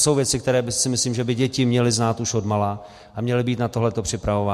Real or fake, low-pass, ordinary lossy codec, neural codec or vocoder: real; 14.4 kHz; MP3, 64 kbps; none